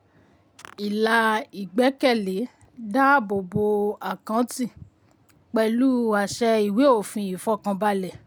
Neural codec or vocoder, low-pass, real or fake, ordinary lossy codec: none; none; real; none